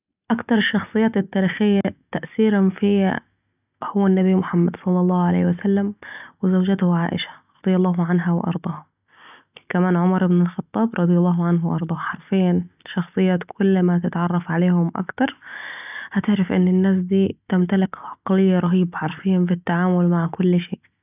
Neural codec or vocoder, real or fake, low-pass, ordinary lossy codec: none; real; 3.6 kHz; none